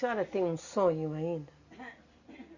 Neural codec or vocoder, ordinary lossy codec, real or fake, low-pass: none; Opus, 64 kbps; real; 7.2 kHz